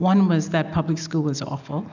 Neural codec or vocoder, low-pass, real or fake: none; 7.2 kHz; real